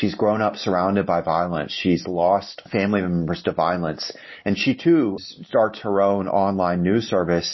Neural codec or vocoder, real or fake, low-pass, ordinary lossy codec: none; real; 7.2 kHz; MP3, 24 kbps